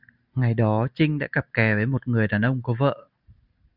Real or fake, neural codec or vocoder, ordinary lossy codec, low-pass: real; none; Opus, 64 kbps; 5.4 kHz